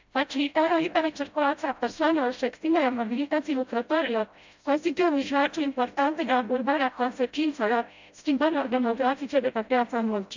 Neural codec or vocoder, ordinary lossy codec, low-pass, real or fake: codec, 16 kHz, 0.5 kbps, FreqCodec, smaller model; MP3, 64 kbps; 7.2 kHz; fake